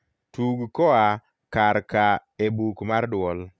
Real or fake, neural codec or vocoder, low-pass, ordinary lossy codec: real; none; none; none